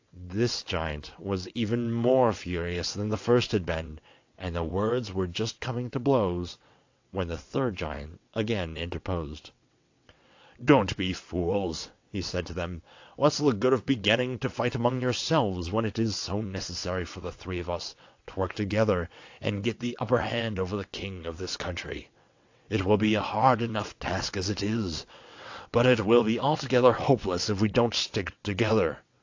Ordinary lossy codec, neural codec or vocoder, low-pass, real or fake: AAC, 48 kbps; vocoder, 22.05 kHz, 80 mel bands, WaveNeXt; 7.2 kHz; fake